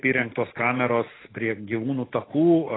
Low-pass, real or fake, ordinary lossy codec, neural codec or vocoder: 7.2 kHz; real; AAC, 16 kbps; none